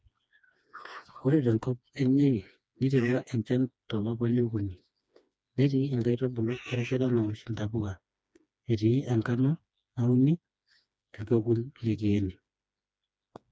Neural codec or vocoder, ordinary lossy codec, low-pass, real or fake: codec, 16 kHz, 2 kbps, FreqCodec, smaller model; none; none; fake